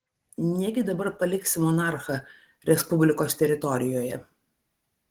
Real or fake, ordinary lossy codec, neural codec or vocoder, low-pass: real; Opus, 16 kbps; none; 19.8 kHz